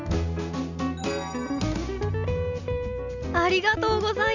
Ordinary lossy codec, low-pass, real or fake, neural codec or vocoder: none; 7.2 kHz; real; none